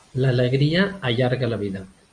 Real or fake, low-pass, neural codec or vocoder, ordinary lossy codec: real; 9.9 kHz; none; MP3, 96 kbps